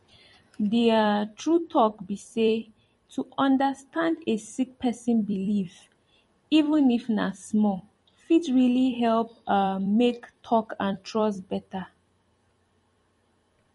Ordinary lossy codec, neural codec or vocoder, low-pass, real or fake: MP3, 48 kbps; vocoder, 44.1 kHz, 128 mel bands every 256 samples, BigVGAN v2; 19.8 kHz; fake